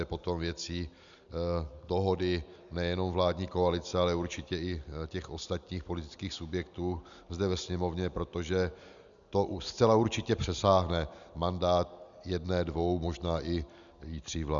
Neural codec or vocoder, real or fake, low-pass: none; real; 7.2 kHz